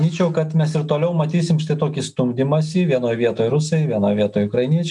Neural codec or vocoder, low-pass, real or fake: none; 10.8 kHz; real